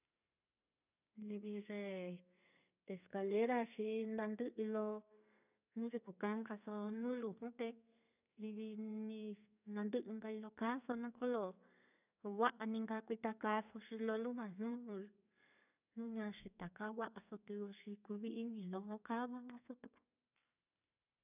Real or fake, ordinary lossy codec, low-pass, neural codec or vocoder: fake; none; 3.6 kHz; codec, 32 kHz, 1.9 kbps, SNAC